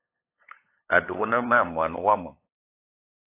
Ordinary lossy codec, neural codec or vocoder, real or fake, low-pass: AAC, 24 kbps; codec, 16 kHz, 8 kbps, FunCodec, trained on LibriTTS, 25 frames a second; fake; 3.6 kHz